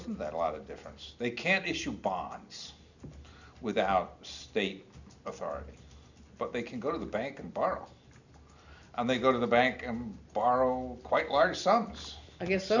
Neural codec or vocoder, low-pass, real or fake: none; 7.2 kHz; real